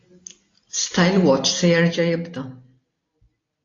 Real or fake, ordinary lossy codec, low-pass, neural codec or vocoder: real; AAC, 32 kbps; 7.2 kHz; none